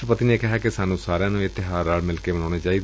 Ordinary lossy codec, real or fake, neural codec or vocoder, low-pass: none; real; none; none